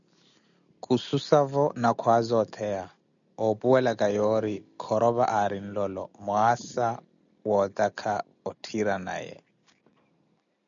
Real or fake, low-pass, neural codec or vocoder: real; 7.2 kHz; none